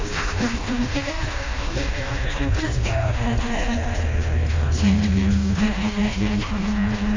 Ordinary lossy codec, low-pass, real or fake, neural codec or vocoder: AAC, 32 kbps; 7.2 kHz; fake; codec, 16 kHz, 1 kbps, FreqCodec, smaller model